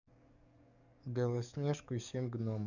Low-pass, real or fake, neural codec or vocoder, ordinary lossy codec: 7.2 kHz; fake; codec, 44.1 kHz, 7.8 kbps, DAC; none